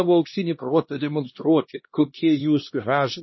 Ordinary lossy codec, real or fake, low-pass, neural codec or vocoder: MP3, 24 kbps; fake; 7.2 kHz; codec, 16 kHz, 1 kbps, X-Codec, HuBERT features, trained on LibriSpeech